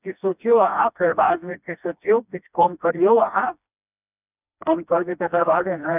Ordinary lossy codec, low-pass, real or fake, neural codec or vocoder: none; 3.6 kHz; fake; codec, 16 kHz, 1 kbps, FreqCodec, smaller model